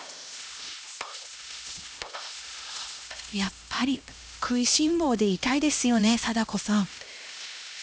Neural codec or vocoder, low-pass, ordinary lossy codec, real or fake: codec, 16 kHz, 1 kbps, X-Codec, HuBERT features, trained on LibriSpeech; none; none; fake